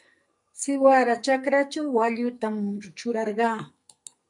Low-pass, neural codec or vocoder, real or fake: 10.8 kHz; codec, 44.1 kHz, 2.6 kbps, SNAC; fake